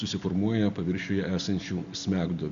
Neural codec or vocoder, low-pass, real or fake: none; 7.2 kHz; real